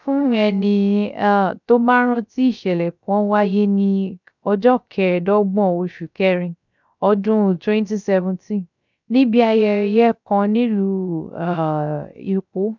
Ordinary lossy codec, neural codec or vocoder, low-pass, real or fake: none; codec, 16 kHz, 0.3 kbps, FocalCodec; 7.2 kHz; fake